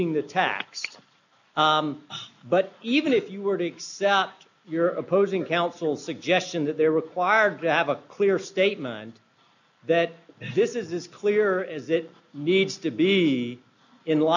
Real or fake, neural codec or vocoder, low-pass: real; none; 7.2 kHz